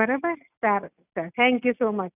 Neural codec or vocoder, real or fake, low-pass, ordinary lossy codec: none; real; 3.6 kHz; none